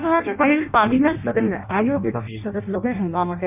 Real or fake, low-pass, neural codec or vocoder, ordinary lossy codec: fake; 3.6 kHz; codec, 16 kHz in and 24 kHz out, 0.6 kbps, FireRedTTS-2 codec; none